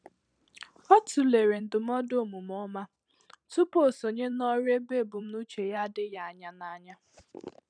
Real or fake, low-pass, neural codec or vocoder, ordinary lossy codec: fake; 9.9 kHz; vocoder, 44.1 kHz, 128 mel bands every 512 samples, BigVGAN v2; none